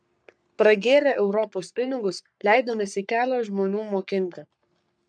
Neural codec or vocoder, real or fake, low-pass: codec, 44.1 kHz, 3.4 kbps, Pupu-Codec; fake; 9.9 kHz